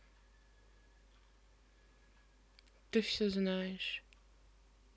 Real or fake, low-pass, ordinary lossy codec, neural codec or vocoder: real; none; none; none